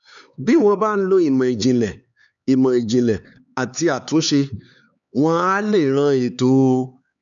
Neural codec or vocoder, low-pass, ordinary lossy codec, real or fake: codec, 16 kHz, 4 kbps, X-Codec, HuBERT features, trained on LibriSpeech; 7.2 kHz; none; fake